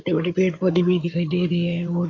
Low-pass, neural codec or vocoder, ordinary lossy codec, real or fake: 7.2 kHz; codec, 16 kHz in and 24 kHz out, 2.2 kbps, FireRedTTS-2 codec; AAC, 32 kbps; fake